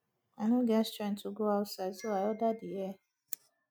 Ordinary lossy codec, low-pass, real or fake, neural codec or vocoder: none; none; real; none